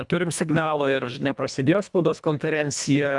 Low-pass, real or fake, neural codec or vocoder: 10.8 kHz; fake; codec, 24 kHz, 1.5 kbps, HILCodec